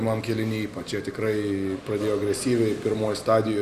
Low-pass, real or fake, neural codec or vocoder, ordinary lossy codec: 14.4 kHz; real; none; Opus, 64 kbps